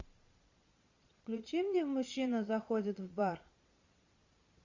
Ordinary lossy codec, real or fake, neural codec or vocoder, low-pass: Opus, 64 kbps; fake; vocoder, 22.05 kHz, 80 mel bands, Vocos; 7.2 kHz